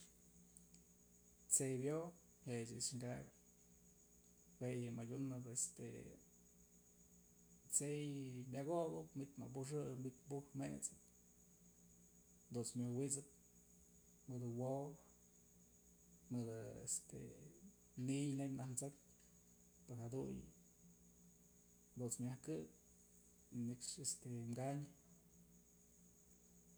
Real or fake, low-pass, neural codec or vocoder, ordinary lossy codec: real; none; none; none